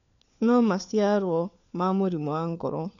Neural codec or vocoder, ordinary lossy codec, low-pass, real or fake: codec, 16 kHz, 4 kbps, FunCodec, trained on LibriTTS, 50 frames a second; none; 7.2 kHz; fake